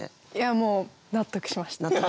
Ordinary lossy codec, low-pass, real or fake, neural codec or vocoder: none; none; real; none